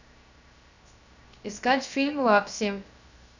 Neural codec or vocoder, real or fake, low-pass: codec, 16 kHz, 0.3 kbps, FocalCodec; fake; 7.2 kHz